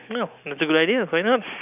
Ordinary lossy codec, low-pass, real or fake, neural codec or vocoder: none; 3.6 kHz; real; none